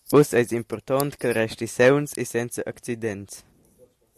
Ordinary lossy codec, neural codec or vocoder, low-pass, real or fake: AAC, 96 kbps; none; 14.4 kHz; real